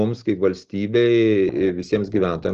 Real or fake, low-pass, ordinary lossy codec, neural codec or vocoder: real; 7.2 kHz; Opus, 16 kbps; none